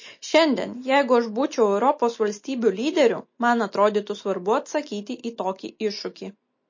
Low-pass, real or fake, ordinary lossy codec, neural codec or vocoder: 7.2 kHz; real; MP3, 32 kbps; none